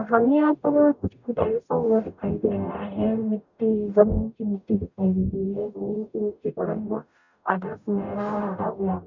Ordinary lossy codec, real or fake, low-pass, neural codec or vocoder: none; fake; 7.2 kHz; codec, 44.1 kHz, 0.9 kbps, DAC